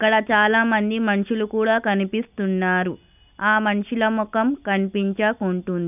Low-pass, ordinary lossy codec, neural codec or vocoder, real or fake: 3.6 kHz; none; none; real